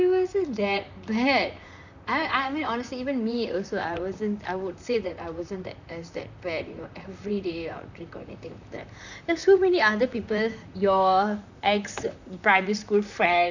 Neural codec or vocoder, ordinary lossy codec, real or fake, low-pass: vocoder, 44.1 kHz, 128 mel bands, Pupu-Vocoder; none; fake; 7.2 kHz